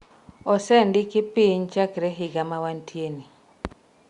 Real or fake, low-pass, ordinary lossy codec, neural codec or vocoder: real; 10.8 kHz; Opus, 64 kbps; none